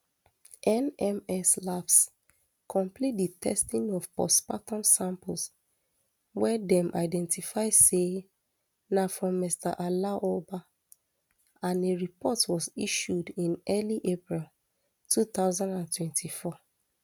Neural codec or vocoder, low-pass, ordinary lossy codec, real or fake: none; none; none; real